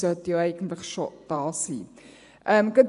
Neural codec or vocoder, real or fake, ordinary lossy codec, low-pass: vocoder, 24 kHz, 100 mel bands, Vocos; fake; none; 10.8 kHz